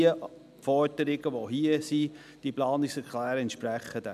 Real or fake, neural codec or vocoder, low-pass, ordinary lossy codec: real; none; 14.4 kHz; none